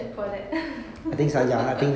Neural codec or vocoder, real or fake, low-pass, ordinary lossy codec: none; real; none; none